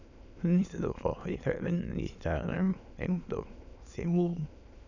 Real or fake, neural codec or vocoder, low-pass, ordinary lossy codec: fake; autoencoder, 22.05 kHz, a latent of 192 numbers a frame, VITS, trained on many speakers; 7.2 kHz; none